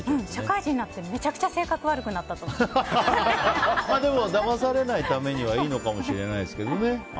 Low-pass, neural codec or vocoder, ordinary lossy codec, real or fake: none; none; none; real